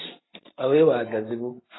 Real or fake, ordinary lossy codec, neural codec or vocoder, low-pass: real; AAC, 16 kbps; none; 7.2 kHz